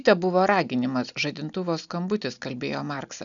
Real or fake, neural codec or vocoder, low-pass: real; none; 7.2 kHz